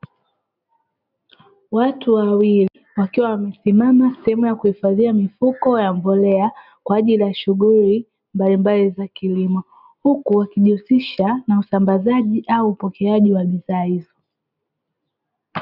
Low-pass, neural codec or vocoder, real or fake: 5.4 kHz; none; real